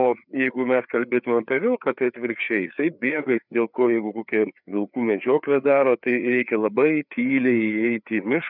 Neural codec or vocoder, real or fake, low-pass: codec, 16 kHz, 4 kbps, FreqCodec, larger model; fake; 5.4 kHz